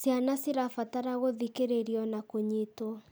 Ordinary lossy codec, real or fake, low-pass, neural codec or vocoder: none; real; none; none